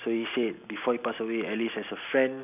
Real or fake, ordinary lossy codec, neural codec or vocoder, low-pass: real; none; none; 3.6 kHz